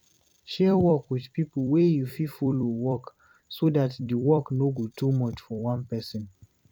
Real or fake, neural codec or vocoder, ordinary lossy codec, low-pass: fake; vocoder, 44.1 kHz, 128 mel bands every 256 samples, BigVGAN v2; none; 19.8 kHz